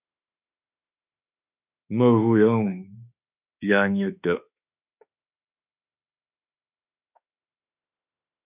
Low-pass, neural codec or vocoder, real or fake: 3.6 kHz; autoencoder, 48 kHz, 32 numbers a frame, DAC-VAE, trained on Japanese speech; fake